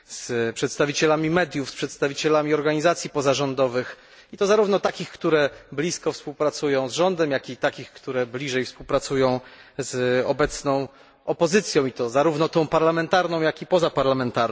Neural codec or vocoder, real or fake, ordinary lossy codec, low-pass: none; real; none; none